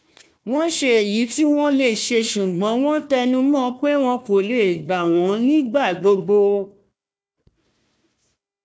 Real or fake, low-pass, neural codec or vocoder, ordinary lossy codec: fake; none; codec, 16 kHz, 1 kbps, FunCodec, trained on Chinese and English, 50 frames a second; none